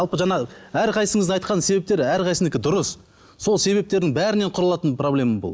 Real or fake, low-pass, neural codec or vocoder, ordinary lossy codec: real; none; none; none